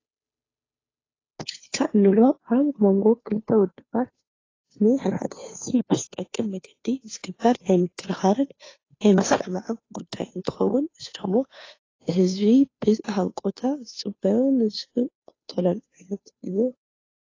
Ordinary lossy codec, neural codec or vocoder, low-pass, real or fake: AAC, 32 kbps; codec, 16 kHz, 2 kbps, FunCodec, trained on Chinese and English, 25 frames a second; 7.2 kHz; fake